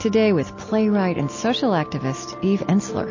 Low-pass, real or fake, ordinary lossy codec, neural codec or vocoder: 7.2 kHz; real; MP3, 32 kbps; none